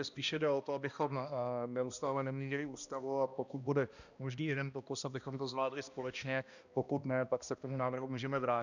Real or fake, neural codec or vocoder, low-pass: fake; codec, 16 kHz, 1 kbps, X-Codec, HuBERT features, trained on balanced general audio; 7.2 kHz